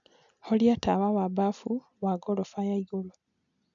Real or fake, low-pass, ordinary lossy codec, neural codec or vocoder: real; 7.2 kHz; MP3, 96 kbps; none